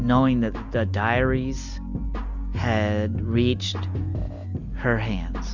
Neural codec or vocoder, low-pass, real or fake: none; 7.2 kHz; real